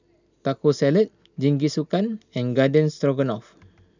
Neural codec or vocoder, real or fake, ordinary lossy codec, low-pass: none; real; none; 7.2 kHz